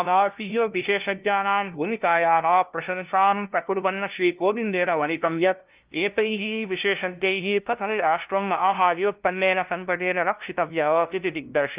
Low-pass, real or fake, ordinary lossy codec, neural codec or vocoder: 3.6 kHz; fake; Opus, 32 kbps; codec, 16 kHz, 0.5 kbps, FunCodec, trained on LibriTTS, 25 frames a second